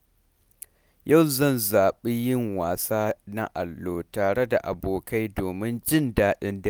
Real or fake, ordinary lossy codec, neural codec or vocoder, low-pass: real; none; none; none